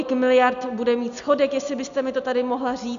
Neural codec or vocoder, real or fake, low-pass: none; real; 7.2 kHz